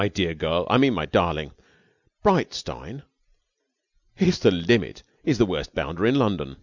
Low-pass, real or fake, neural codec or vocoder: 7.2 kHz; real; none